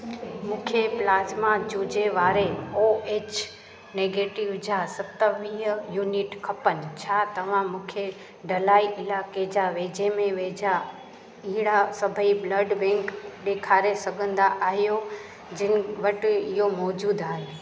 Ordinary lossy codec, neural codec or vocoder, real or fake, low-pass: none; none; real; none